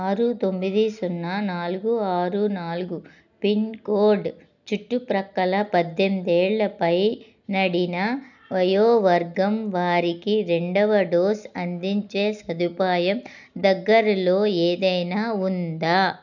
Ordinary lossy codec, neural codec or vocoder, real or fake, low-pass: none; none; real; 7.2 kHz